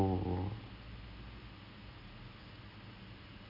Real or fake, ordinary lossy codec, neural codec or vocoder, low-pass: real; none; none; 5.4 kHz